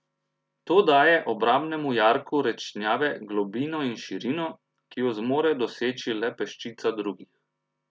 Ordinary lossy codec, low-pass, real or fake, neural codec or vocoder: none; none; real; none